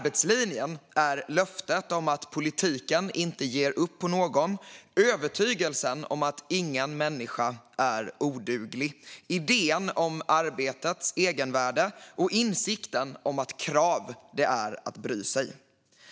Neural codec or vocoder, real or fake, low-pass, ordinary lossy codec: none; real; none; none